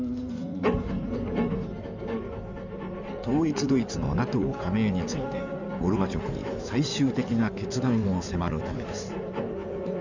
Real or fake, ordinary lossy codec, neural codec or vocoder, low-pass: fake; none; codec, 16 kHz in and 24 kHz out, 2.2 kbps, FireRedTTS-2 codec; 7.2 kHz